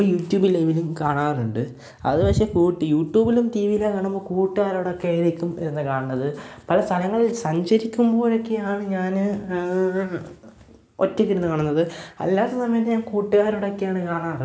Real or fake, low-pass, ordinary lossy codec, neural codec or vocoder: real; none; none; none